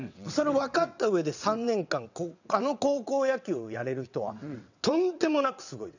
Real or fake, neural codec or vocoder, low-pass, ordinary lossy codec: fake; vocoder, 22.05 kHz, 80 mel bands, WaveNeXt; 7.2 kHz; none